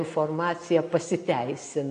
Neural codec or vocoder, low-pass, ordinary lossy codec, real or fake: none; 9.9 kHz; AAC, 48 kbps; real